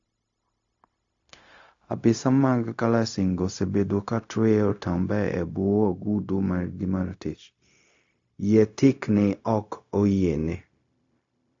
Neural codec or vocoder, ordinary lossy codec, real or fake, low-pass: codec, 16 kHz, 0.4 kbps, LongCat-Audio-Codec; AAC, 48 kbps; fake; 7.2 kHz